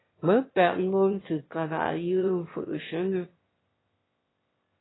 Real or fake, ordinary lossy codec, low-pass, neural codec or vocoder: fake; AAC, 16 kbps; 7.2 kHz; autoencoder, 22.05 kHz, a latent of 192 numbers a frame, VITS, trained on one speaker